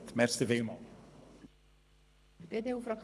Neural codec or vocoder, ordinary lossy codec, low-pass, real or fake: codec, 24 kHz, 6 kbps, HILCodec; none; none; fake